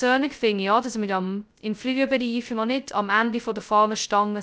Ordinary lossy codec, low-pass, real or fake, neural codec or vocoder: none; none; fake; codec, 16 kHz, 0.2 kbps, FocalCodec